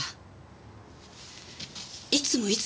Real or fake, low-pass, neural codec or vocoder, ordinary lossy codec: real; none; none; none